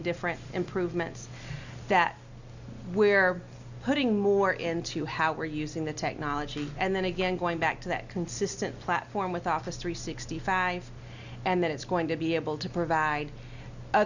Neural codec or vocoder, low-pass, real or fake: none; 7.2 kHz; real